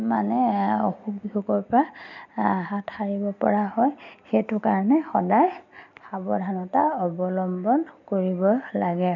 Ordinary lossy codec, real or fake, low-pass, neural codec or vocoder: none; real; 7.2 kHz; none